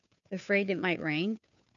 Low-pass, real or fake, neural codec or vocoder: 7.2 kHz; fake; codec, 16 kHz, 2 kbps, FunCodec, trained on Chinese and English, 25 frames a second